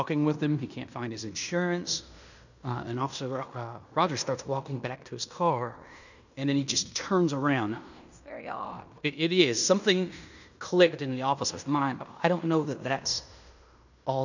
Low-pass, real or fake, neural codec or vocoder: 7.2 kHz; fake; codec, 16 kHz in and 24 kHz out, 0.9 kbps, LongCat-Audio-Codec, fine tuned four codebook decoder